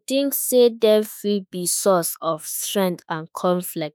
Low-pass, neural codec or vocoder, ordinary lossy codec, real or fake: none; autoencoder, 48 kHz, 32 numbers a frame, DAC-VAE, trained on Japanese speech; none; fake